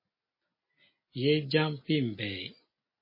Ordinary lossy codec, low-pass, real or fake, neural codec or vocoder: MP3, 24 kbps; 5.4 kHz; real; none